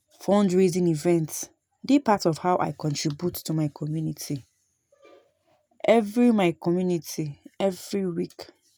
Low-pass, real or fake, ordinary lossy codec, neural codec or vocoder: none; real; none; none